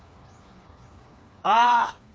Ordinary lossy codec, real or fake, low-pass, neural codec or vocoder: none; fake; none; codec, 16 kHz, 4 kbps, FreqCodec, smaller model